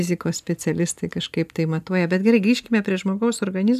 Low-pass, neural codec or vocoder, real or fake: 14.4 kHz; none; real